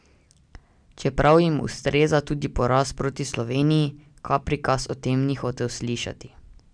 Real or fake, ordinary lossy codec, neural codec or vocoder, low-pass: real; none; none; 9.9 kHz